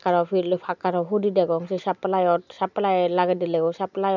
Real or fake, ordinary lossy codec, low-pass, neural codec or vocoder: real; none; 7.2 kHz; none